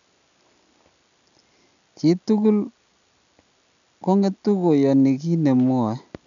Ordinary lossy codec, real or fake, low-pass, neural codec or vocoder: none; real; 7.2 kHz; none